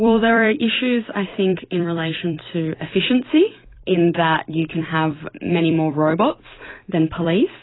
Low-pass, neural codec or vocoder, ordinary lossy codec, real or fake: 7.2 kHz; vocoder, 44.1 kHz, 128 mel bands every 256 samples, BigVGAN v2; AAC, 16 kbps; fake